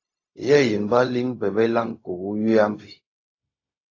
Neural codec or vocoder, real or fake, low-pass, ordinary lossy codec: codec, 16 kHz, 0.4 kbps, LongCat-Audio-Codec; fake; 7.2 kHz; AAC, 32 kbps